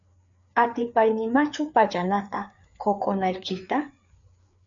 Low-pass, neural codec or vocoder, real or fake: 7.2 kHz; codec, 16 kHz, 4 kbps, FreqCodec, larger model; fake